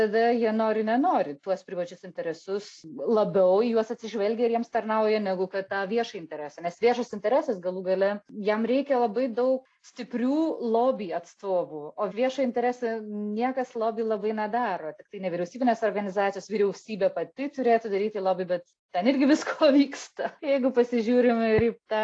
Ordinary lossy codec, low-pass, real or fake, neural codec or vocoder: AAC, 48 kbps; 9.9 kHz; real; none